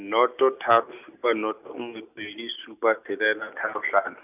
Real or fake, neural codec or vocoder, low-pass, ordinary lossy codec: real; none; 3.6 kHz; none